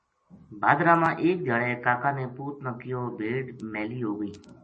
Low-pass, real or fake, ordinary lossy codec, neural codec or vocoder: 10.8 kHz; real; MP3, 32 kbps; none